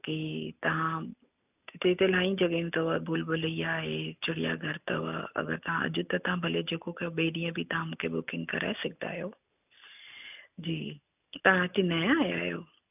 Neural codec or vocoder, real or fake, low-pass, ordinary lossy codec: none; real; 3.6 kHz; none